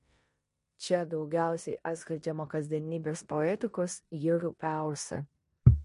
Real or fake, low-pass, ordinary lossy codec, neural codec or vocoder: fake; 10.8 kHz; MP3, 48 kbps; codec, 16 kHz in and 24 kHz out, 0.9 kbps, LongCat-Audio-Codec, four codebook decoder